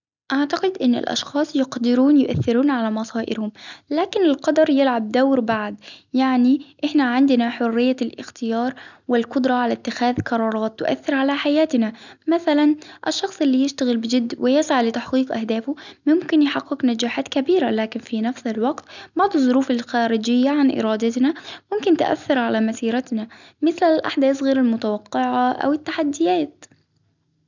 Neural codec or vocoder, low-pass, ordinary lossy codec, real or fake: none; 7.2 kHz; none; real